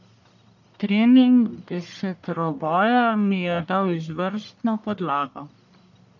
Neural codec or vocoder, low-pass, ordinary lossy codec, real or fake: codec, 44.1 kHz, 1.7 kbps, Pupu-Codec; 7.2 kHz; none; fake